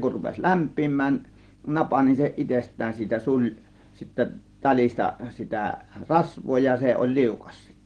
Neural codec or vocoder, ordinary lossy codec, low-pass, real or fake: none; Opus, 16 kbps; 9.9 kHz; real